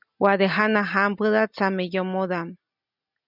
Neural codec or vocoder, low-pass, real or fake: none; 5.4 kHz; real